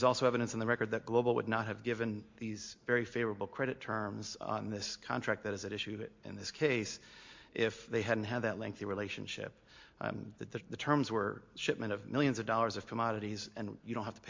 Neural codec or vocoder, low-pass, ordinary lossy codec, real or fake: none; 7.2 kHz; MP3, 48 kbps; real